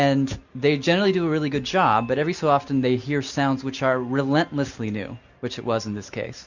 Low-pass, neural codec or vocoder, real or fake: 7.2 kHz; none; real